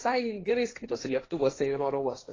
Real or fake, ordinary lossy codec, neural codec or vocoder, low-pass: fake; AAC, 32 kbps; codec, 16 kHz, 1.1 kbps, Voila-Tokenizer; 7.2 kHz